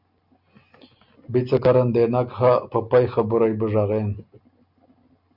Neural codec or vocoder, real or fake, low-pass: none; real; 5.4 kHz